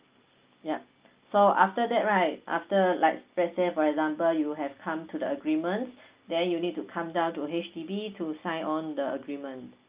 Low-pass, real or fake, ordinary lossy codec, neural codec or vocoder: 3.6 kHz; real; Opus, 24 kbps; none